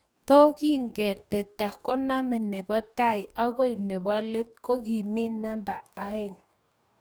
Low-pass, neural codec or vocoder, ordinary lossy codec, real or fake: none; codec, 44.1 kHz, 2.6 kbps, DAC; none; fake